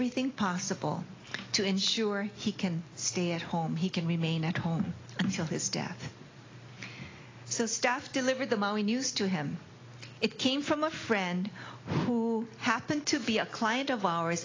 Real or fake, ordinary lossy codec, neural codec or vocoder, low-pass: real; AAC, 32 kbps; none; 7.2 kHz